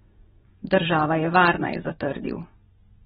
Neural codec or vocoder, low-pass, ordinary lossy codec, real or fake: vocoder, 24 kHz, 100 mel bands, Vocos; 10.8 kHz; AAC, 16 kbps; fake